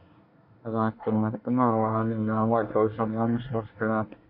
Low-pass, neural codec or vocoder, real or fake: 5.4 kHz; codec, 24 kHz, 1 kbps, SNAC; fake